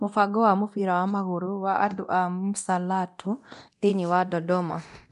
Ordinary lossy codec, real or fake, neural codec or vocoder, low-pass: MP3, 64 kbps; fake; codec, 24 kHz, 0.9 kbps, DualCodec; 10.8 kHz